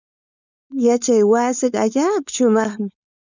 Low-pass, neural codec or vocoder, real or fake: 7.2 kHz; codec, 16 kHz, 4.8 kbps, FACodec; fake